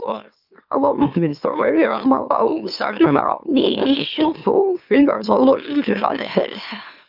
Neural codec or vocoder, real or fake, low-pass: autoencoder, 44.1 kHz, a latent of 192 numbers a frame, MeloTTS; fake; 5.4 kHz